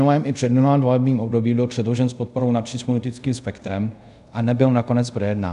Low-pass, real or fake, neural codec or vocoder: 10.8 kHz; fake; codec, 24 kHz, 0.5 kbps, DualCodec